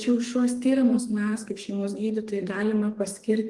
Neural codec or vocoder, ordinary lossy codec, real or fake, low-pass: codec, 32 kHz, 1.9 kbps, SNAC; Opus, 24 kbps; fake; 10.8 kHz